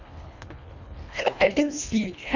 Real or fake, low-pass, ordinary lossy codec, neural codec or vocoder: fake; 7.2 kHz; none; codec, 24 kHz, 1.5 kbps, HILCodec